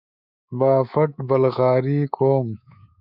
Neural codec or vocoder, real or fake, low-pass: codec, 24 kHz, 3.1 kbps, DualCodec; fake; 5.4 kHz